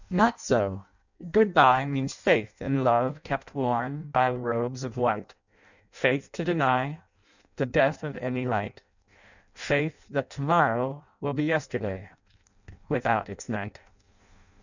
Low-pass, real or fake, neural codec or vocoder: 7.2 kHz; fake; codec, 16 kHz in and 24 kHz out, 0.6 kbps, FireRedTTS-2 codec